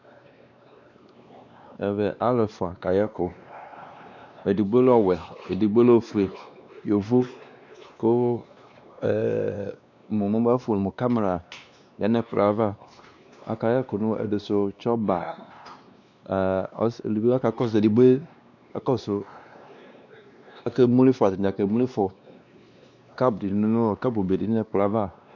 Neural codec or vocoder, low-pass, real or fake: codec, 16 kHz, 2 kbps, X-Codec, WavLM features, trained on Multilingual LibriSpeech; 7.2 kHz; fake